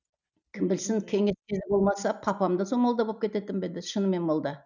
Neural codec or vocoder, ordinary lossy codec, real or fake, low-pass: none; none; real; 7.2 kHz